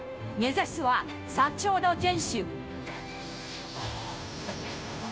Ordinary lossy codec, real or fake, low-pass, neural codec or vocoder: none; fake; none; codec, 16 kHz, 0.5 kbps, FunCodec, trained on Chinese and English, 25 frames a second